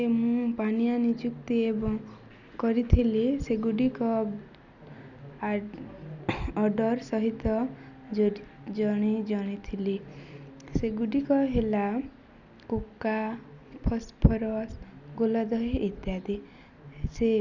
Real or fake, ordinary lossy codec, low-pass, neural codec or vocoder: real; none; 7.2 kHz; none